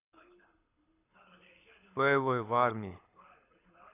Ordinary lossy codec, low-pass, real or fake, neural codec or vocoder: AAC, 24 kbps; 3.6 kHz; fake; codec, 24 kHz, 6 kbps, HILCodec